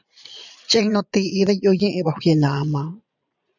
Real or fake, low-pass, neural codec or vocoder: fake; 7.2 kHz; vocoder, 22.05 kHz, 80 mel bands, Vocos